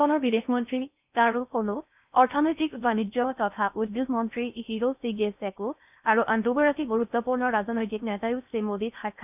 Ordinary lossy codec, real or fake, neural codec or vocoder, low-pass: none; fake; codec, 16 kHz in and 24 kHz out, 0.6 kbps, FocalCodec, streaming, 4096 codes; 3.6 kHz